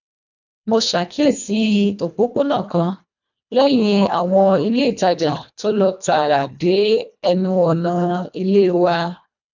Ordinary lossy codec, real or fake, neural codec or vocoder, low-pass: none; fake; codec, 24 kHz, 1.5 kbps, HILCodec; 7.2 kHz